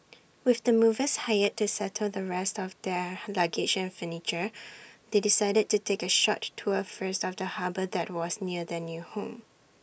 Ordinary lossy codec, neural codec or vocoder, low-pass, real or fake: none; none; none; real